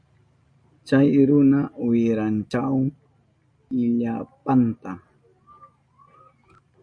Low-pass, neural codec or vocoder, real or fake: 9.9 kHz; none; real